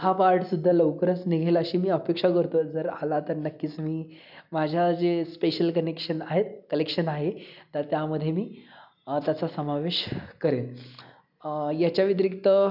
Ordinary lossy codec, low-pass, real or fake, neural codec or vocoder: none; 5.4 kHz; real; none